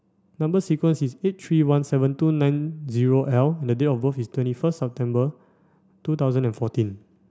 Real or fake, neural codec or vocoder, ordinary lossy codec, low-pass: real; none; none; none